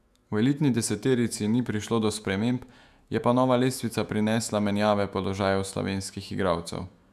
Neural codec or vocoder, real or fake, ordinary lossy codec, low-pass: autoencoder, 48 kHz, 128 numbers a frame, DAC-VAE, trained on Japanese speech; fake; none; 14.4 kHz